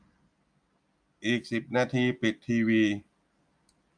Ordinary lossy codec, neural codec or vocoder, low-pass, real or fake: MP3, 64 kbps; none; 9.9 kHz; real